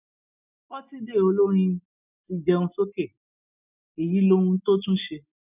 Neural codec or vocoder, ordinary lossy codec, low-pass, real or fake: none; none; 3.6 kHz; real